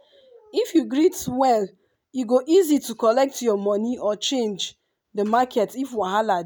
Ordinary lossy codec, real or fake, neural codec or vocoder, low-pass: none; real; none; none